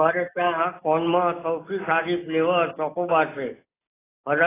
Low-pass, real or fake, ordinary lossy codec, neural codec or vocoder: 3.6 kHz; real; AAC, 16 kbps; none